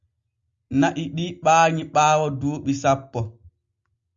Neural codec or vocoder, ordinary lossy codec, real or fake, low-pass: none; Opus, 64 kbps; real; 7.2 kHz